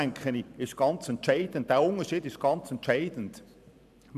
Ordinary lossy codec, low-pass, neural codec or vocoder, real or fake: none; 14.4 kHz; vocoder, 44.1 kHz, 128 mel bands every 512 samples, BigVGAN v2; fake